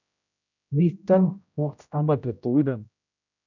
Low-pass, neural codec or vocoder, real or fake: 7.2 kHz; codec, 16 kHz, 0.5 kbps, X-Codec, HuBERT features, trained on general audio; fake